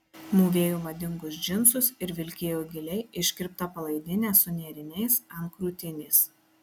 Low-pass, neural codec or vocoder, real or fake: 19.8 kHz; none; real